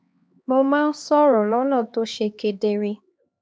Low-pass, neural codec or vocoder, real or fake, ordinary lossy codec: none; codec, 16 kHz, 2 kbps, X-Codec, HuBERT features, trained on LibriSpeech; fake; none